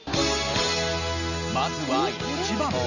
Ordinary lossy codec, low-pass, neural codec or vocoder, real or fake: none; 7.2 kHz; none; real